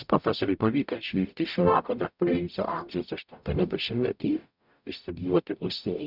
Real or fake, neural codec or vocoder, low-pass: fake; codec, 44.1 kHz, 0.9 kbps, DAC; 5.4 kHz